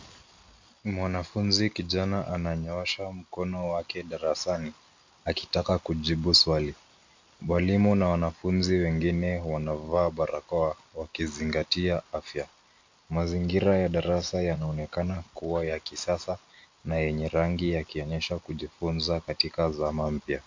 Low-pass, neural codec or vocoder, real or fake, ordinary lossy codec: 7.2 kHz; none; real; MP3, 48 kbps